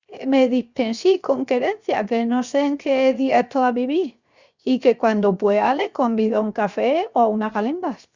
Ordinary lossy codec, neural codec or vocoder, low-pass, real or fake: Opus, 64 kbps; codec, 16 kHz, 0.7 kbps, FocalCodec; 7.2 kHz; fake